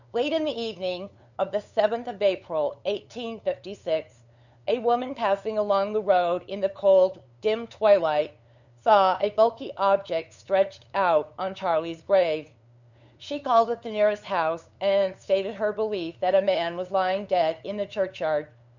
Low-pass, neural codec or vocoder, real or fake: 7.2 kHz; codec, 16 kHz, 8 kbps, FunCodec, trained on LibriTTS, 25 frames a second; fake